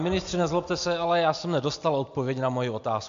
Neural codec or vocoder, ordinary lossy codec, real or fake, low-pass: none; AAC, 64 kbps; real; 7.2 kHz